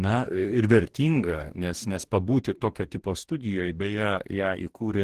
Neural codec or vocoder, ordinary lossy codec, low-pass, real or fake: codec, 44.1 kHz, 2.6 kbps, DAC; Opus, 16 kbps; 14.4 kHz; fake